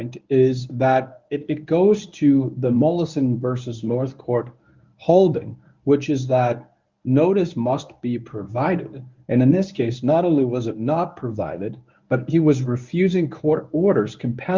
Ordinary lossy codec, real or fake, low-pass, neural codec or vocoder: Opus, 32 kbps; fake; 7.2 kHz; codec, 24 kHz, 0.9 kbps, WavTokenizer, medium speech release version 1